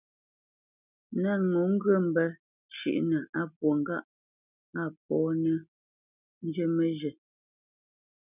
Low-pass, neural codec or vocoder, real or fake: 3.6 kHz; none; real